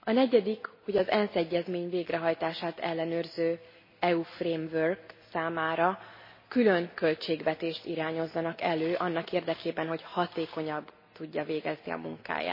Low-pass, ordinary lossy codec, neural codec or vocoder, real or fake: 5.4 kHz; MP3, 24 kbps; none; real